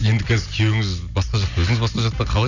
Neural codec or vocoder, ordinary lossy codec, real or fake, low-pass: none; none; real; 7.2 kHz